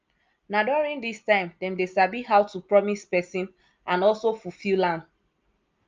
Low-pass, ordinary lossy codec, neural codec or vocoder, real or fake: 7.2 kHz; Opus, 24 kbps; none; real